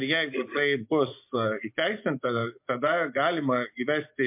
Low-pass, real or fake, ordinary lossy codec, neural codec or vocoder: 3.6 kHz; fake; MP3, 32 kbps; vocoder, 24 kHz, 100 mel bands, Vocos